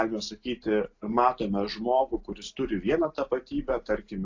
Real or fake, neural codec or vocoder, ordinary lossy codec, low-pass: real; none; AAC, 48 kbps; 7.2 kHz